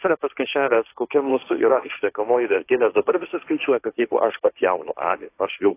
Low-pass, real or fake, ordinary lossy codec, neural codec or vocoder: 3.6 kHz; fake; AAC, 24 kbps; codec, 16 kHz, 1.1 kbps, Voila-Tokenizer